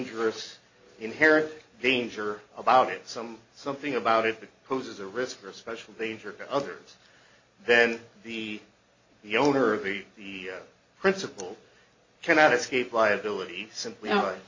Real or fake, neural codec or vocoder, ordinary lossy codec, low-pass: real; none; MP3, 32 kbps; 7.2 kHz